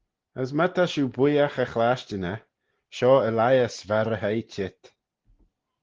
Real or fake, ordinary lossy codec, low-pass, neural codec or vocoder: real; Opus, 16 kbps; 7.2 kHz; none